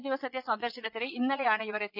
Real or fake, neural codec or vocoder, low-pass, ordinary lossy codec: fake; vocoder, 22.05 kHz, 80 mel bands, Vocos; 5.4 kHz; none